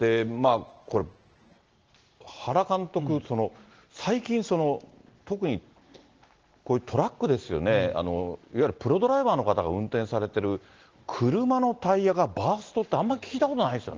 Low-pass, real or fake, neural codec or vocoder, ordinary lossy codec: 7.2 kHz; real; none; Opus, 16 kbps